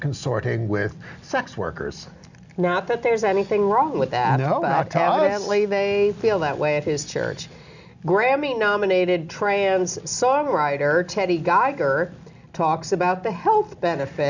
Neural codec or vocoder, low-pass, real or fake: none; 7.2 kHz; real